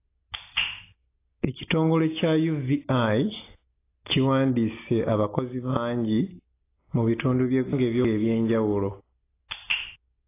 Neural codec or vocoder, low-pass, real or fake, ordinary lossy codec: none; 3.6 kHz; real; AAC, 24 kbps